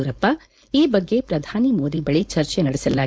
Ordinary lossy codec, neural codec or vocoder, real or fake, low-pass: none; codec, 16 kHz, 4.8 kbps, FACodec; fake; none